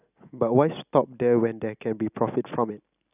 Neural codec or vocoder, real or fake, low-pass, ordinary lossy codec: none; real; 3.6 kHz; none